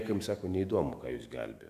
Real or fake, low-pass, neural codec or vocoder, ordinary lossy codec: fake; 14.4 kHz; autoencoder, 48 kHz, 128 numbers a frame, DAC-VAE, trained on Japanese speech; AAC, 96 kbps